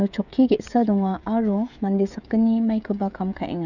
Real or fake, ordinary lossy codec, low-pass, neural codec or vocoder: fake; none; 7.2 kHz; codec, 16 kHz, 16 kbps, FreqCodec, smaller model